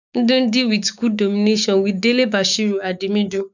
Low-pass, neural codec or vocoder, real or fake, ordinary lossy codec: 7.2 kHz; codec, 16 kHz, 6 kbps, DAC; fake; none